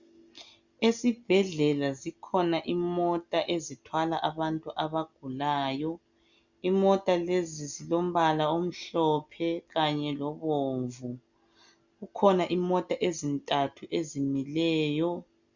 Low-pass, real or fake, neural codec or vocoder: 7.2 kHz; real; none